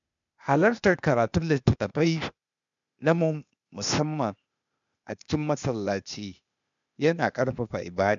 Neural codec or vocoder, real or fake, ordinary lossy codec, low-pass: codec, 16 kHz, 0.8 kbps, ZipCodec; fake; MP3, 96 kbps; 7.2 kHz